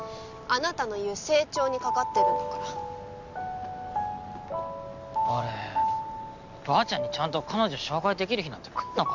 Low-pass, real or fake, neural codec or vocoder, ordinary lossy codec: 7.2 kHz; real; none; none